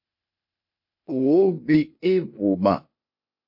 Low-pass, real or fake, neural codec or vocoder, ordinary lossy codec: 5.4 kHz; fake; codec, 16 kHz, 0.8 kbps, ZipCodec; MP3, 32 kbps